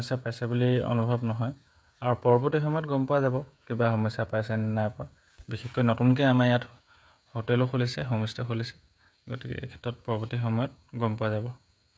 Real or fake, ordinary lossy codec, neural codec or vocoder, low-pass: fake; none; codec, 16 kHz, 16 kbps, FreqCodec, smaller model; none